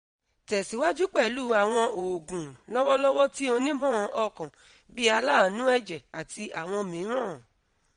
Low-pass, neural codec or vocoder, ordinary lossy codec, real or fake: 9.9 kHz; vocoder, 22.05 kHz, 80 mel bands, Vocos; MP3, 48 kbps; fake